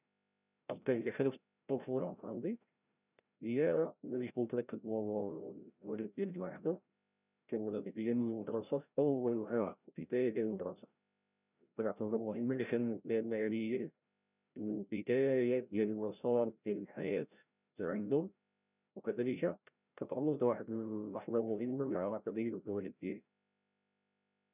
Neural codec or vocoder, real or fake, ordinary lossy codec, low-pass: codec, 16 kHz, 0.5 kbps, FreqCodec, larger model; fake; none; 3.6 kHz